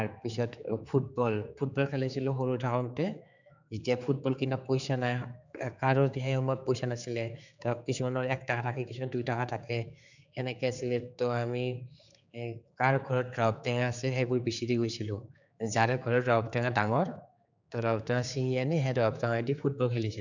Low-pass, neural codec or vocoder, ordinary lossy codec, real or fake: 7.2 kHz; codec, 16 kHz, 4 kbps, X-Codec, HuBERT features, trained on general audio; none; fake